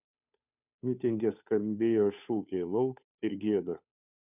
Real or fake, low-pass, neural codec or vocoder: fake; 3.6 kHz; codec, 16 kHz, 2 kbps, FunCodec, trained on Chinese and English, 25 frames a second